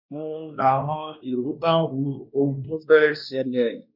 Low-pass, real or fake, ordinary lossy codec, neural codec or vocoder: 5.4 kHz; fake; none; codec, 16 kHz, 1 kbps, X-Codec, WavLM features, trained on Multilingual LibriSpeech